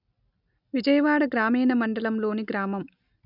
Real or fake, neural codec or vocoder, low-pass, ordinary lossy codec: real; none; 5.4 kHz; none